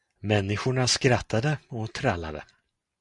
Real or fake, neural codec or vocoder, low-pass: real; none; 10.8 kHz